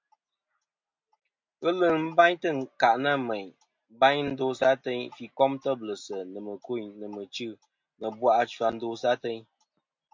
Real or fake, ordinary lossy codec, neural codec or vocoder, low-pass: real; MP3, 48 kbps; none; 7.2 kHz